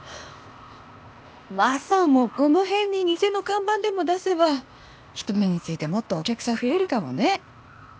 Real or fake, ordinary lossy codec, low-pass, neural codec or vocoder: fake; none; none; codec, 16 kHz, 0.8 kbps, ZipCodec